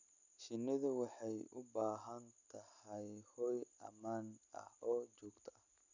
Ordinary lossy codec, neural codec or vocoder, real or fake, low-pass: none; none; real; 7.2 kHz